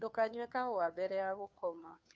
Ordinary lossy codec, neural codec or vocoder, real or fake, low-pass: none; codec, 16 kHz, 2 kbps, FunCodec, trained on Chinese and English, 25 frames a second; fake; none